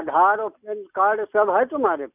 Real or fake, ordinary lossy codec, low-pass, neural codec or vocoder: real; none; 3.6 kHz; none